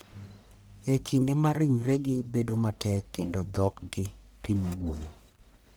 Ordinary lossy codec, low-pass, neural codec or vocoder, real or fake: none; none; codec, 44.1 kHz, 1.7 kbps, Pupu-Codec; fake